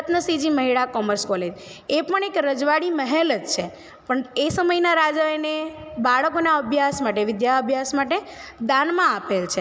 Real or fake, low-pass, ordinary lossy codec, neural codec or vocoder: real; none; none; none